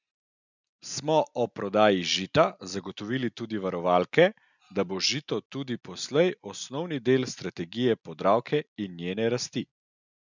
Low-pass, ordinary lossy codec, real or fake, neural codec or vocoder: 7.2 kHz; none; real; none